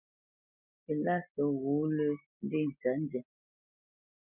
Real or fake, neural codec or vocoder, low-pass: real; none; 3.6 kHz